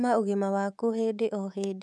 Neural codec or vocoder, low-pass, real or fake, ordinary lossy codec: codec, 24 kHz, 3.1 kbps, DualCodec; none; fake; none